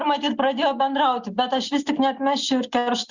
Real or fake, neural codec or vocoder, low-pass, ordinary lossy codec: real; none; 7.2 kHz; Opus, 64 kbps